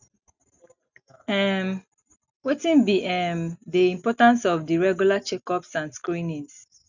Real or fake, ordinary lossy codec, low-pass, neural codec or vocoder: real; none; 7.2 kHz; none